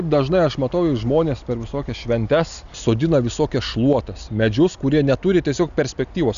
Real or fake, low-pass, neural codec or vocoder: real; 7.2 kHz; none